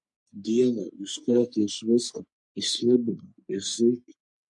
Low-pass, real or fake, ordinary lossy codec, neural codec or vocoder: 10.8 kHz; fake; MP3, 64 kbps; codec, 44.1 kHz, 3.4 kbps, Pupu-Codec